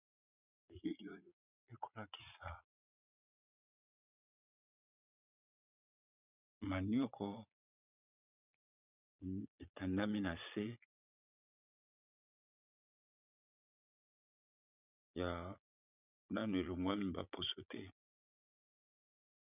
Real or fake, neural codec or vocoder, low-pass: fake; vocoder, 22.05 kHz, 80 mel bands, Vocos; 3.6 kHz